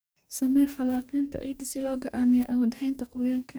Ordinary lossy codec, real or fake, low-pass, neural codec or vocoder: none; fake; none; codec, 44.1 kHz, 2.6 kbps, DAC